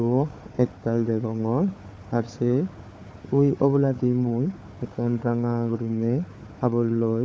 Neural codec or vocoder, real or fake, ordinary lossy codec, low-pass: codec, 16 kHz, 4 kbps, FunCodec, trained on Chinese and English, 50 frames a second; fake; none; none